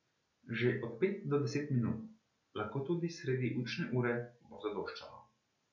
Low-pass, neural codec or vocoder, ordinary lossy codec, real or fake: 7.2 kHz; none; MP3, 48 kbps; real